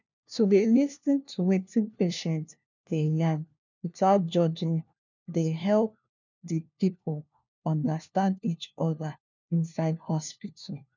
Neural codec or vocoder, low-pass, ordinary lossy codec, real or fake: codec, 16 kHz, 1 kbps, FunCodec, trained on LibriTTS, 50 frames a second; 7.2 kHz; none; fake